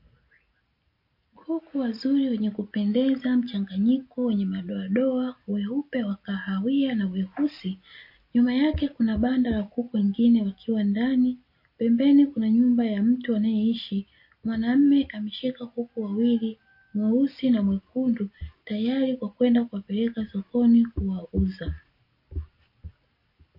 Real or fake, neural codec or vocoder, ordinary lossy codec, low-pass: real; none; MP3, 32 kbps; 5.4 kHz